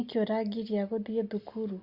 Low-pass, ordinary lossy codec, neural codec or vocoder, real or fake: 5.4 kHz; Opus, 64 kbps; none; real